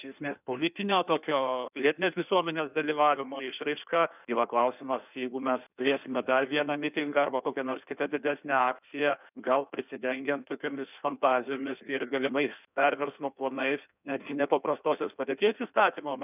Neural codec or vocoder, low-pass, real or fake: codec, 16 kHz in and 24 kHz out, 1.1 kbps, FireRedTTS-2 codec; 3.6 kHz; fake